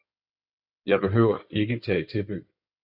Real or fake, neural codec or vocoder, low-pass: fake; codec, 16 kHz in and 24 kHz out, 2.2 kbps, FireRedTTS-2 codec; 5.4 kHz